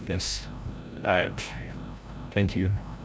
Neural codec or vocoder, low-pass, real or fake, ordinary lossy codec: codec, 16 kHz, 0.5 kbps, FreqCodec, larger model; none; fake; none